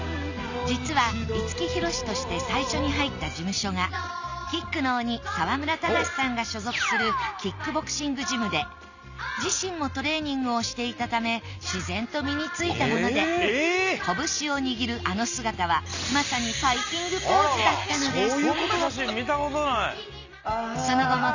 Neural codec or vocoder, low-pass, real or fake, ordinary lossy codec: none; 7.2 kHz; real; none